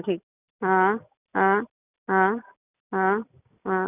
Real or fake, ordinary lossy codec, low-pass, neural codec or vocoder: real; none; 3.6 kHz; none